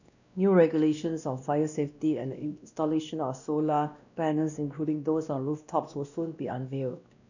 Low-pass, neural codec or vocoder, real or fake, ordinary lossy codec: 7.2 kHz; codec, 16 kHz, 1 kbps, X-Codec, WavLM features, trained on Multilingual LibriSpeech; fake; none